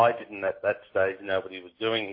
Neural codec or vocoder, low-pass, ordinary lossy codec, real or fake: none; 5.4 kHz; MP3, 24 kbps; real